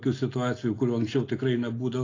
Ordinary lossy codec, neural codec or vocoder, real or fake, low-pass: AAC, 32 kbps; none; real; 7.2 kHz